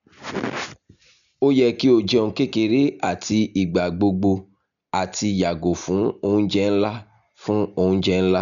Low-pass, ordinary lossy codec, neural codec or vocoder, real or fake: 7.2 kHz; none; none; real